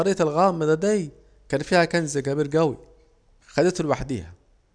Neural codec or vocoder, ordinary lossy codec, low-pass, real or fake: none; none; 9.9 kHz; real